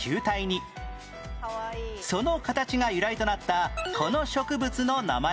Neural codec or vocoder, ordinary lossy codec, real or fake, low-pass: none; none; real; none